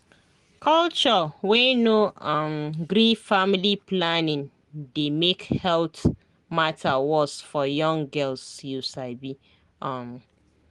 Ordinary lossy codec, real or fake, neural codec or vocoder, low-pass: Opus, 24 kbps; real; none; 10.8 kHz